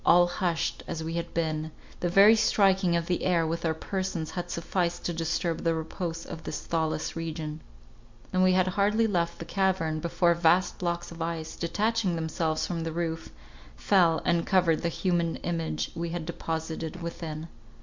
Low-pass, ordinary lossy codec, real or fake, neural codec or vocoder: 7.2 kHz; MP3, 64 kbps; real; none